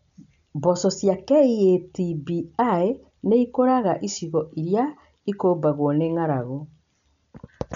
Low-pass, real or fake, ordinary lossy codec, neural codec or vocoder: 7.2 kHz; real; none; none